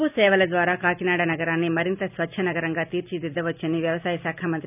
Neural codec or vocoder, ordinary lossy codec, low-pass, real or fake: none; none; 3.6 kHz; real